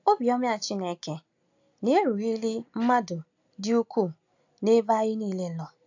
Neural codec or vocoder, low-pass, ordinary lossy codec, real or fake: none; 7.2 kHz; AAC, 48 kbps; real